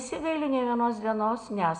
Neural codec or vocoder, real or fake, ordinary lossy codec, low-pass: none; real; MP3, 96 kbps; 10.8 kHz